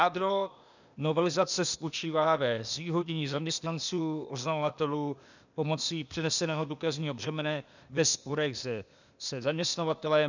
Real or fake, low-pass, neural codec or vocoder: fake; 7.2 kHz; codec, 16 kHz, 0.8 kbps, ZipCodec